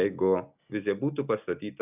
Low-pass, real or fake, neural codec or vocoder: 3.6 kHz; real; none